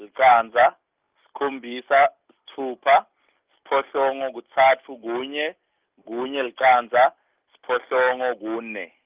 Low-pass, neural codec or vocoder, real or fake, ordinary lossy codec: 3.6 kHz; none; real; Opus, 32 kbps